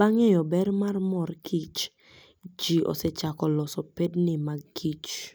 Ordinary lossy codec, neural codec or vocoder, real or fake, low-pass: none; none; real; none